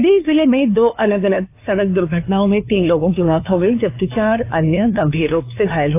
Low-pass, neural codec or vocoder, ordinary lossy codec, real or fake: 3.6 kHz; codec, 16 kHz, 2 kbps, FunCodec, trained on LibriTTS, 25 frames a second; AAC, 24 kbps; fake